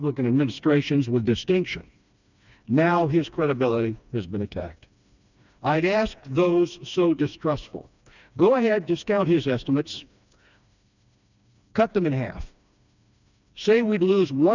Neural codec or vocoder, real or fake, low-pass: codec, 16 kHz, 2 kbps, FreqCodec, smaller model; fake; 7.2 kHz